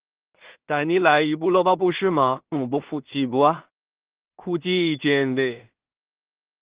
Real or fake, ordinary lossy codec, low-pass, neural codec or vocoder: fake; Opus, 16 kbps; 3.6 kHz; codec, 16 kHz in and 24 kHz out, 0.4 kbps, LongCat-Audio-Codec, two codebook decoder